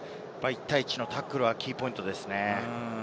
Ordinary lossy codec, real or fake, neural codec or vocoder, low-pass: none; real; none; none